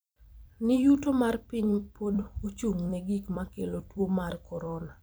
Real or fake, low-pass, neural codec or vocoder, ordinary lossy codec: fake; none; vocoder, 44.1 kHz, 128 mel bands every 256 samples, BigVGAN v2; none